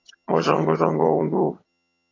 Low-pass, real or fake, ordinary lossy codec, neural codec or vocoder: 7.2 kHz; fake; AAC, 32 kbps; vocoder, 22.05 kHz, 80 mel bands, HiFi-GAN